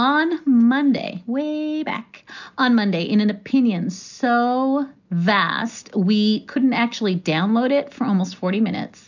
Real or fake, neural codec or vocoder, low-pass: real; none; 7.2 kHz